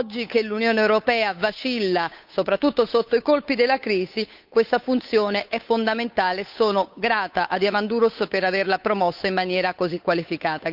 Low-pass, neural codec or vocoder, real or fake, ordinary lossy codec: 5.4 kHz; codec, 16 kHz, 8 kbps, FunCodec, trained on Chinese and English, 25 frames a second; fake; none